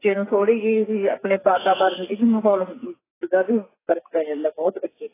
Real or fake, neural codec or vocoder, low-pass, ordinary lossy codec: fake; codec, 44.1 kHz, 2.6 kbps, SNAC; 3.6 kHz; AAC, 16 kbps